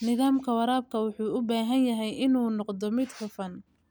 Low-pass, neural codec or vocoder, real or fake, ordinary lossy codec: none; none; real; none